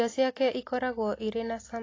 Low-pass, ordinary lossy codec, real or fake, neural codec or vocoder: 7.2 kHz; AAC, 32 kbps; real; none